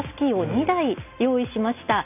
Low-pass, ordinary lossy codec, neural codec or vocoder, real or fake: 3.6 kHz; none; none; real